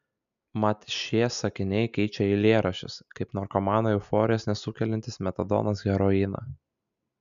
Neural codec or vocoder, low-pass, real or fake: none; 7.2 kHz; real